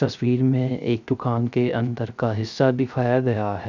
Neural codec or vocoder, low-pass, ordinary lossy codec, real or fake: codec, 16 kHz, 0.3 kbps, FocalCodec; 7.2 kHz; none; fake